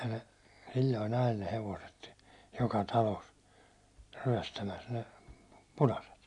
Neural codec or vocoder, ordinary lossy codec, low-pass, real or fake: none; none; 10.8 kHz; real